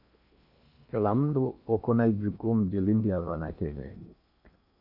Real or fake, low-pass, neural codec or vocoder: fake; 5.4 kHz; codec, 16 kHz in and 24 kHz out, 0.8 kbps, FocalCodec, streaming, 65536 codes